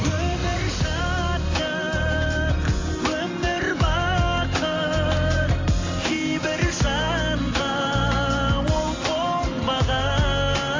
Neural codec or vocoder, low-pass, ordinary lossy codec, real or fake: vocoder, 44.1 kHz, 128 mel bands every 512 samples, BigVGAN v2; 7.2 kHz; AAC, 32 kbps; fake